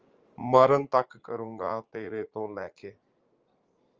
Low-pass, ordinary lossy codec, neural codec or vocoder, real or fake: 7.2 kHz; Opus, 24 kbps; vocoder, 44.1 kHz, 80 mel bands, Vocos; fake